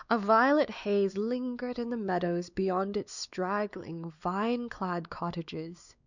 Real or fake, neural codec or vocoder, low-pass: fake; codec, 16 kHz, 4 kbps, X-Codec, WavLM features, trained on Multilingual LibriSpeech; 7.2 kHz